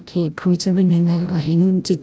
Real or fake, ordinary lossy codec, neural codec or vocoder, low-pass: fake; none; codec, 16 kHz, 0.5 kbps, FreqCodec, larger model; none